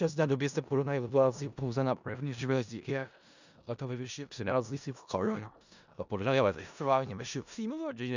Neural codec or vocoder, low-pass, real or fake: codec, 16 kHz in and 24 kHz out, 0.4 kbps, LongCat-Audio-Codec, four codebook decoder; 7.2 kHz; fake